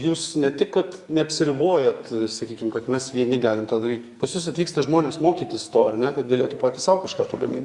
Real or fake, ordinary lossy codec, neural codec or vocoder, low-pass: fake; Opus, 64 kbps; codec, 44.1 kHz, 2.6 kbps, SNAC; 10.8 kHz